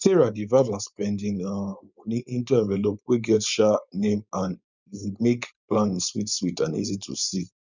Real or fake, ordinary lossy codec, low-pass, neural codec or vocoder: fake; none; 7.2 kHz; codec, 16 kHz, 4.8 kbps, FACodec